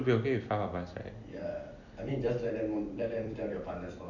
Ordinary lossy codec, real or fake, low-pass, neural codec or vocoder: none; fake; 7.2 kHz; vocoder, 44.1 kHz, 128 mel bands every 256 samples, BigVGAN v2